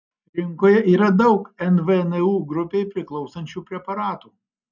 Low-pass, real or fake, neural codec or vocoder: 7.2 kHz; real; none